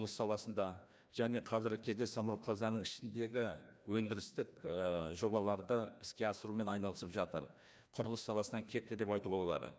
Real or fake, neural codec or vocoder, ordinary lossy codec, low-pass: fake; codec, 16 kHz, 1 kbps, FreqCodec, larger model; none; none